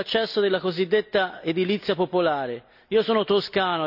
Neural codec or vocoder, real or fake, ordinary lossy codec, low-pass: none; real; none; 5.4 kHz